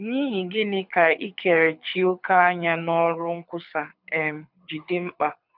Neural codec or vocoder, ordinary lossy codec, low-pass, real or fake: codec, 24 kHz, 6 kbps, HILCodec; none; 5.4 kHz; fake